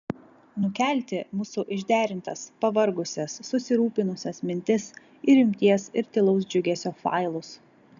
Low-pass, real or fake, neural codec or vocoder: 7.2 kHz; real; none